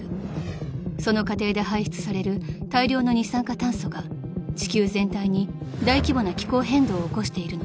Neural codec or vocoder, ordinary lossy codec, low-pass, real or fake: none; none; none; real